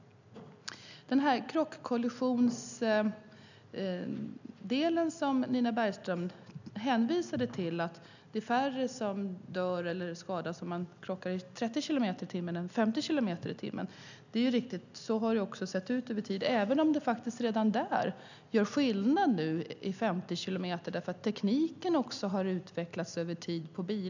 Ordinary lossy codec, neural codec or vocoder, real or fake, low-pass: none; none; real; 7.2 kHz